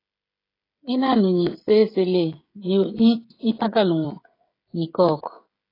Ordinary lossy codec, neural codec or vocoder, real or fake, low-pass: AAC, 24 kbps; codec, 16 kHz, 16 kbps, FreqCodec, smaller model; fake; 5.4 kHz